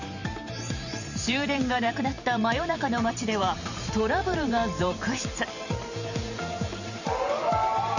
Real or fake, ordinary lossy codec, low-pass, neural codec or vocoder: fake; none; 7.2 kHz; vocoder, 44.1 kHz, 128 mel bands every 512 samples, BigVGAN v2